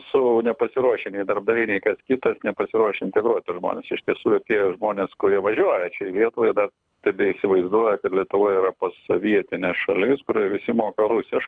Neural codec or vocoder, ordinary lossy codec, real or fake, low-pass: codec, 24 kHz, 6 kbps, HILCodec; MP3, 96 kbps; fake; 9.9 kHz